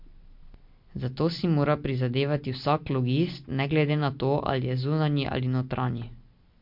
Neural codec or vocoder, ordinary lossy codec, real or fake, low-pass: none; MP3, 48 kbps; real; 5.4 kHz